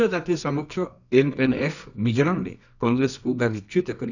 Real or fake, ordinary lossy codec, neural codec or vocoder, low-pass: fake; none; codec, 24 kHz, 0.9 kbps, WavTokenizer, medium music audio release; 7.2 kHz